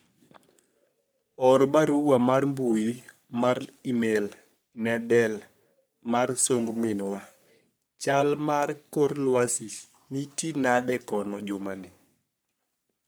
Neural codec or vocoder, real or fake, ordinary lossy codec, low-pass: codec, 44.1 kHz, 3.4 kbps, Pupu-Codec; fake; none; none